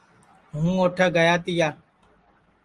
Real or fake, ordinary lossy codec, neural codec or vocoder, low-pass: real; Opus, 24 kbps; none; 10.8 kHz